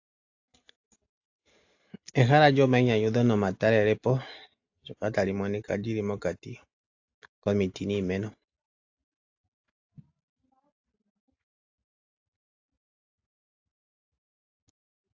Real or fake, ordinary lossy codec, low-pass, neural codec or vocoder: real; AAC, 48 kbps; 7.2 kHz; none